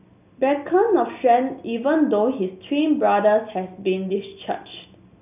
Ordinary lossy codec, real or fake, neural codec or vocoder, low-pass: none; real; none; 3.6 kHz